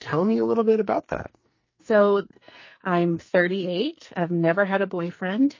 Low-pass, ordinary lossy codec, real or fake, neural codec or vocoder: 7.2 kHz; MP3, 32 kbps; fake; codec, 44.1 kHz, 2.6 kbps, SNAC